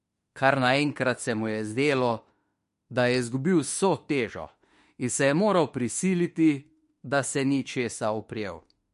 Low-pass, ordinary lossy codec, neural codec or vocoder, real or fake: 14.4 kHz; MP3, 48 kbps; autoencoder, 48 kHz, 32 numbers a frame, DAC-VAE, trained on Japanese speech; fake